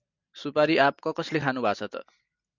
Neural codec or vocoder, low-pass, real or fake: none; 7.2 kHz; real